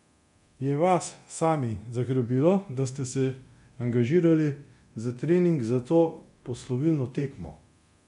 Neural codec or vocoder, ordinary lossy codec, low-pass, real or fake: codec, 24 kHz, 0.9 kbps, DualCodec; none; 10.8 kHz; fake